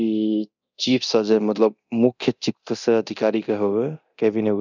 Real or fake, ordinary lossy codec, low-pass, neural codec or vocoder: fake; none; 7.2 kHz; codec, 24 kHz, 0.9 kbps, DualCodec